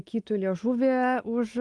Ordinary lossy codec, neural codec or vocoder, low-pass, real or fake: Opus, 24 kbps; none; 10.8 kHz; real